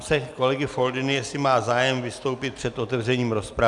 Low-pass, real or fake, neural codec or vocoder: 10.8 kHz; real; none